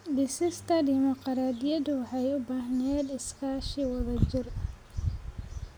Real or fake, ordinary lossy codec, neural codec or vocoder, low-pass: real; none; none; none